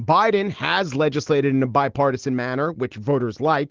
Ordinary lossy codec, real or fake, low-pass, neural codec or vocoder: Opus, 16 kbps; real; 7.2 kHz; none